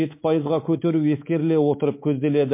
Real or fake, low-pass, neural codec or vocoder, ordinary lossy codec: fake; 3.6 kHz; codec, 24 kHz, 3.1 kbps, DualCodec; MP3, 24 kbps